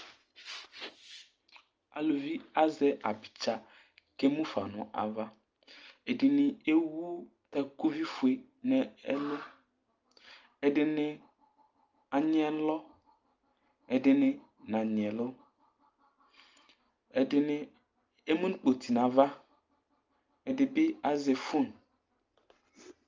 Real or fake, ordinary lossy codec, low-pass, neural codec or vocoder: real; Opus, 24 kbps; 7.2 kHz; none